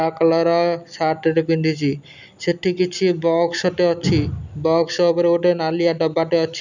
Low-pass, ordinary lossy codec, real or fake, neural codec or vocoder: 7.2 kHz; none; real; none